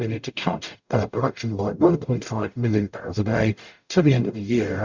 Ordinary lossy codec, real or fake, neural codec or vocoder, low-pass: Opus, 64 kbps; fake; codec, 44.1 kHz, 0.9 kbps, DAC; 7.2 kHz